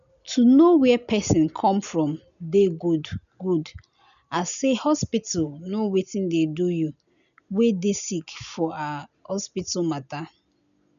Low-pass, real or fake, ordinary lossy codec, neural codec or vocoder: 7.2 kHz; real; none; none